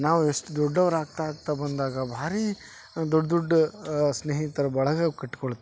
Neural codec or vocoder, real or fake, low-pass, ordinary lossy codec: none; real; none; none